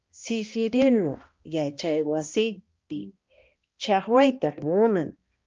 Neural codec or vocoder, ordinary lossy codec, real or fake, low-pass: codec, 16 kHz, 1 kbps, X-Codec, HuBERT features, trained on balanced general audio; Opus, 24 kbps; fake; 7.2 kHz